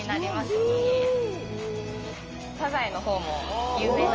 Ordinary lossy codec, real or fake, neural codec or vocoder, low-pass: Opus, 24 kbps; real; none; 7.2 kHz